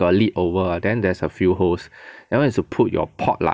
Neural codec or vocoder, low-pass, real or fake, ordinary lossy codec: none; none; real; none